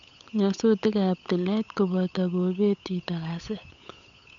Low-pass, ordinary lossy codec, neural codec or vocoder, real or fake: 7.2 kHz; none; codec, 16 kHz, 8 kbps, FunCodec, trained on Chinese and English, 25 frames a second; fake